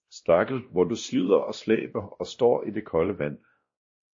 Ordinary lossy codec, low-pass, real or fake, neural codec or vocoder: MP3, 32 kbps; 7.2 kHz; fake; codec, 16 kHz, 1 kbps, X-Codec, WavLM features, trained on Multilingual LibriSpeech